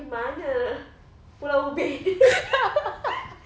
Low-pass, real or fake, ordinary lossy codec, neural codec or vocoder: none; real; none; none